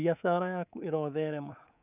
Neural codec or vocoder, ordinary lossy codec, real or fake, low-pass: codec, 24 kHz, 3.1 kbps, DualCodec; none; fake; 3.6 kHz